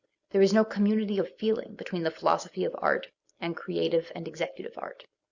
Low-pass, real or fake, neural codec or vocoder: 7.2 kHz; real; none